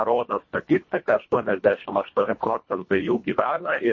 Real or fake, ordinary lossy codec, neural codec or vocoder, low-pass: fake; MP3, 32 kbps; codec, 24 kHz, 1.5 kbps, HILCodec; 7.2 kHz